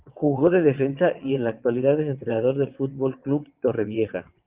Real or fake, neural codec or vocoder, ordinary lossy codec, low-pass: fake; vocoder, 22.05 kHz, 80 mel bands, WaveNeXt; Opus, 24 kbps; 3.6 kHz